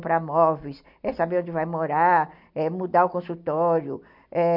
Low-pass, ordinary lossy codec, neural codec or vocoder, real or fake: 5.4 kHz; MP3, 48 kbps; none; real